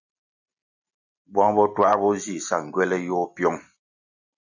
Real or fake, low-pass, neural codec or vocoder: real; 7.2 kHz; none